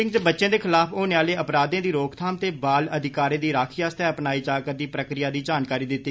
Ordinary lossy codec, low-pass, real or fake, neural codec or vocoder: none; none; real; none